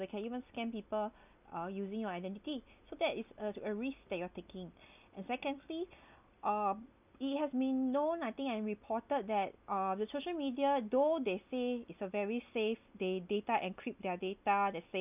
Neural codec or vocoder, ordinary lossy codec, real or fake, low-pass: none; none; real; 3.6 kHz